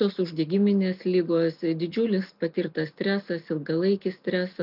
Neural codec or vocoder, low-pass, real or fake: none; 5.4 kHz; real